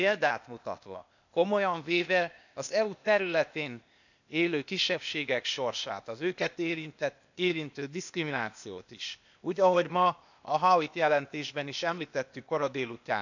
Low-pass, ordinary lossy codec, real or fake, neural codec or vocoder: 7.2 kHz; none; fake; codec, 16 kHz, 0.8 kbps, ZipCodec